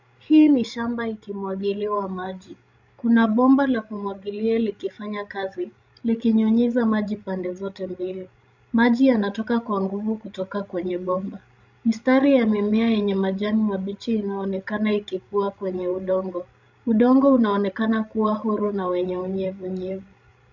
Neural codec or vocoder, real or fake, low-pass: codec, 16 kHz, 16 kbps, FreqCodec, larger model; fake; 7.2 kHz